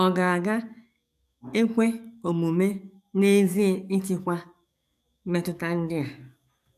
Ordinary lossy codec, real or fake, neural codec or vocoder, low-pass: none; fake; codec, 44.1 kHz, 7.8 kbps, DAC; 14.4 kHz